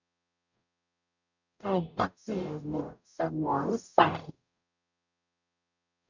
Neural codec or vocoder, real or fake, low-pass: codec, 44.1 kHz, 0.9 kbps, DAC; fake; 7.2 kHz